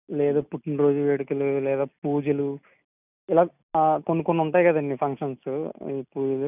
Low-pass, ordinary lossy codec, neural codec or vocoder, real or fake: 3.6 kHz; none; none; real